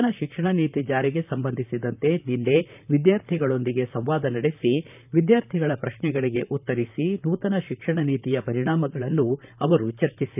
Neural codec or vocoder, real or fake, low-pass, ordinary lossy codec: vocoder, 44.1 kHz, 128 mel bands, Pupu-Vocoder; fake; 3.6 kHz; none